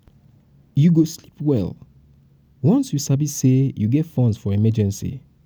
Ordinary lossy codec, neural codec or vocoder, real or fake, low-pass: none; none; real; none